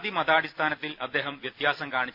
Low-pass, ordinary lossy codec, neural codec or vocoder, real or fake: 5.4 kHz; none; none; real